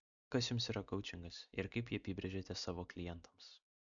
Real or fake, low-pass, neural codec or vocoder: real; 7.2 kHz; none